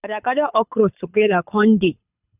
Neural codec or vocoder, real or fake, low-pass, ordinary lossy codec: codec, 24 kHz, 6 kbps, HILCodec; fake; 3.6 kHz; none